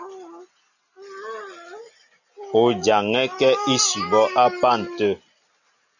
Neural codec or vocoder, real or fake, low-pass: none; real; 7.2 kHz